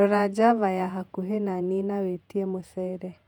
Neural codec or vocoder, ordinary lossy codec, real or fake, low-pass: vocoder, 48 kHz, 128 mel bands, Vocos; MP3, 96 kbps; fake; 19.8 kHz